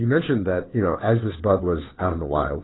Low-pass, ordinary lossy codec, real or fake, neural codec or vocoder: 7.2 kHz; AAC, 16 kbps; fake; codec, 16 kHz, 2 kbps, FunCodec, trained on Chinese and English, 25 frames a second